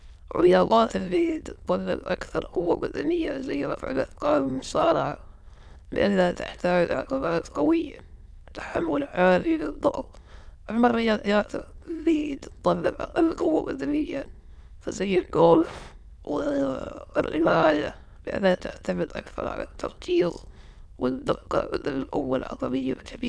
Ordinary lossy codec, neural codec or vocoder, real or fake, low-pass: none; autoencoder, 22.05 kHz, a latent of 192 numbers a frame, VITS, trained on many speakers; fake; none